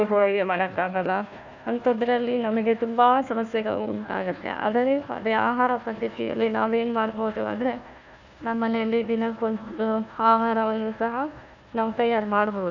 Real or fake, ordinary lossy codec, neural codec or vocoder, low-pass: fake; none; codec, 16 kHz, 1 kbps, FunCodec, trained on Chinese and English, 50 frames a second; 7.2 kHz